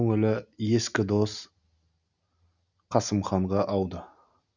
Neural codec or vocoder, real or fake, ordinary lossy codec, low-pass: none; real; none; 7.2 kHz